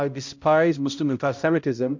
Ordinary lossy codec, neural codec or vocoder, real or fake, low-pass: MP3, 48 kbps; codec, 16 kHz, 0.5 kbps, X-Codec, HuBERT features, trained on balanced general audio; fake; 7.2 kHz